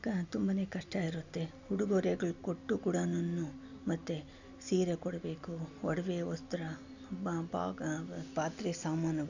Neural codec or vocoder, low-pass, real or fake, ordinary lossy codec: none; 7.2 kHz; real; none